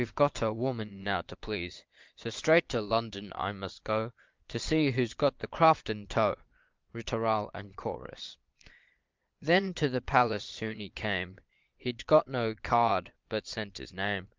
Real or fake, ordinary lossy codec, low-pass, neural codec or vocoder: fake; Opus, 32 kbps; 7.2 kHz; vocoder, 22.05 kHz, 80 mel bands, Vocos